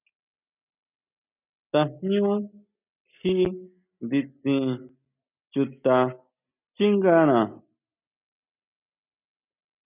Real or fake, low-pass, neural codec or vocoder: real; 3.6 kHz; none